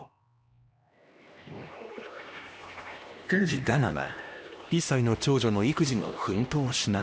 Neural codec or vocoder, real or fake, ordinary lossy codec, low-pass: codec, 16 kHz, 1 kbps, X-Codec, HuBERT features, trained on LibriSpeech; fake; none; none